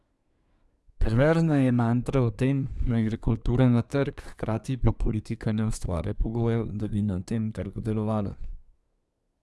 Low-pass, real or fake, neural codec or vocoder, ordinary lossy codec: none; fake; codec, 24 kHz, 1 kbps, SNAC; none